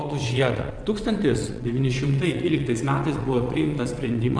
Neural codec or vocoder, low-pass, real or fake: vocoder, 22.05 kHz, 80 mel bands, WaveNeXt; 9.9 kHz; fake